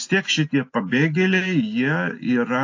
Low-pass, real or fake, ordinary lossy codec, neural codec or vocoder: 7.2 kHz; real; AAC, 48 kbps; none